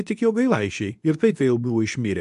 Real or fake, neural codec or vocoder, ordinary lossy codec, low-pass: fake; codec, 24 kHz, 0.9 kbps, WavTokenizer, medium speech release version 2; AAC, 96 kbps; 10.8 kHz